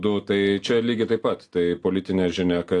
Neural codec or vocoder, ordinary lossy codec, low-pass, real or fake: none; AAC, 48 kbps; 10.8 kHz; real